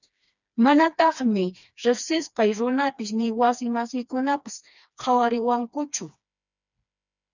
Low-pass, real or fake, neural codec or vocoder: 7.2 kHz; fake; codec, 16 kHz, 2 kbps, FreqCodec, smaller model